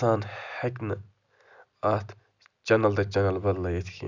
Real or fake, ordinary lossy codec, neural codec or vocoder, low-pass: real; none; none; 7.2 kHz